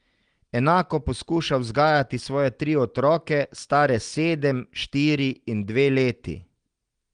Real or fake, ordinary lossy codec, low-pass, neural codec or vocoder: real; Opus, 24 kbps; 9.9 kHz; none